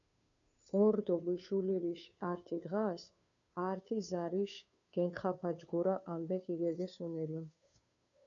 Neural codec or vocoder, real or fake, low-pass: codec, 16 kHz, 2 kbps, FunCodec, trained on Chinese and English, 25 frames a second; fake; 7.2 kHz